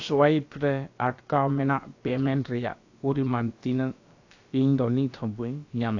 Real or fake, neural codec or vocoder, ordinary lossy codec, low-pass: fake; codec, 16 kHz, about 1 kbps, DyCAST, with the encoder's durations; AAC, 48 kbps; 7.2 kHz